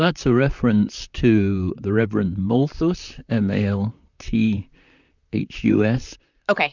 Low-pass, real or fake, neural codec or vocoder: 7.2 kHz; fake; vocoder, 44.1 kHz, 128 mel bands, Pupu-Vocoder